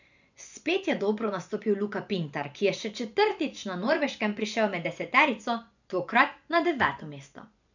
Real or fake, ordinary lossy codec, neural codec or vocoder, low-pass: fake; none; vocoder, 24 kHz, 100 mel bands, Vocos; 7.2 kHz